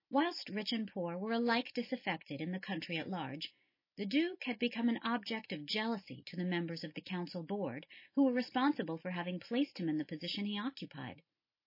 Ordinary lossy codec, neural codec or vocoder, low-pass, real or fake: MP3, 24 kbps; none; 5.4 kHz; real